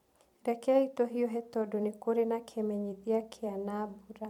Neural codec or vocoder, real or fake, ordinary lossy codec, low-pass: vocoder, 44.1 kHz, 128 mel bands every 256 samples, BigVGAN v2; fake; MP3, 96 kbps; 19.8 kHz